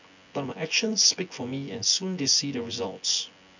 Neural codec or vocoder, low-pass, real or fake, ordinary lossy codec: vocoder, 24 kHz, 100 mel bands, Vocos; 7.2 kHz; fake; none